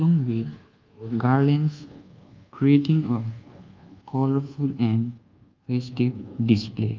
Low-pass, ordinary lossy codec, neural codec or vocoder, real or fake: 7.2 kHz; Opus, 24 kbps; codec, 24 kHz, 1.2 kbps, DualCodec; fake